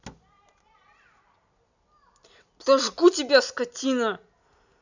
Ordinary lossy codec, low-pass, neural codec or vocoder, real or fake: none; 7.2 kHz; none; real